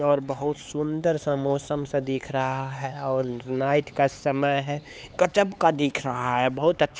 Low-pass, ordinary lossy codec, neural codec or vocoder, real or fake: none; none; codec, 16 kHz, 4 kbps, X-Codec, HuBERT features, trained on LibriSpeech; fake